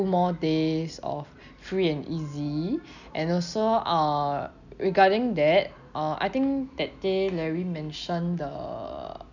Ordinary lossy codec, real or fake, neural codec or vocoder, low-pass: none; real; none; 7.2 kHz